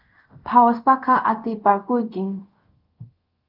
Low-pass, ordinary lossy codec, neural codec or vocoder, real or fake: 5.4 kHz; Opus, 32 kbps; codec, 24 kHz, 0.5 kbps, DualCodec; fake